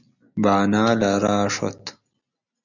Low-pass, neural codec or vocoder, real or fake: 7.2 kHz; none; real